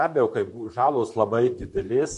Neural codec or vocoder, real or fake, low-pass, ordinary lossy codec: vocoder, 44.1 kHz, 128 mel bands, Pupu-Vocoder; fake; 14.4 kHz; MP3, 48 kbps